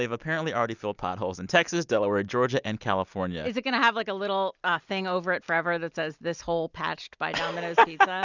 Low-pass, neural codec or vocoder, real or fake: 7.2 kHz; none; real